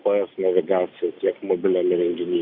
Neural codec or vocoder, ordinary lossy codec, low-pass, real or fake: none; Opus, 64 kbps; 5.4 kHz; real